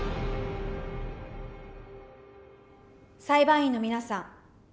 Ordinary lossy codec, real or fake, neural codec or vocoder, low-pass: none; real; none; none